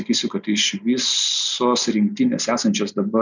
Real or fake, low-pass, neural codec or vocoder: real; 7.2 kHz; none